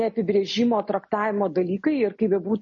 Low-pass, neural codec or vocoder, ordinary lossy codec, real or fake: 7.2 kHz; none; MP3, 32 kbps; real